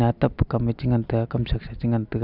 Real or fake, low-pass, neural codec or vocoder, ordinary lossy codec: real; 5.4 kHz; none; none